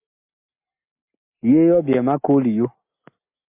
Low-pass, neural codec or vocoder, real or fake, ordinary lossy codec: 3.6 kHz; none; real; MP3, 32 kbps